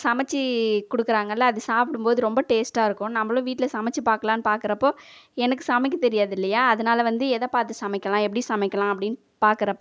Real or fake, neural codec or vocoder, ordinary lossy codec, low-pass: real; none; none; none